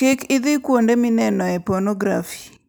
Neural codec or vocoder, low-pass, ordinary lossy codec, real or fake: none; none; none; real